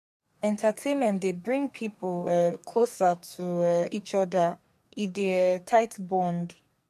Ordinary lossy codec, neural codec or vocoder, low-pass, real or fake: MP3, 64 kbps; codec, 44.1 kHz, 2.6 kbps, SNAC; 14.4 kHz; fake